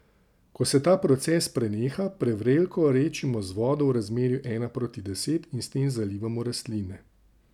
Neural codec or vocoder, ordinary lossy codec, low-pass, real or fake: none; none; 19.8 kHz; real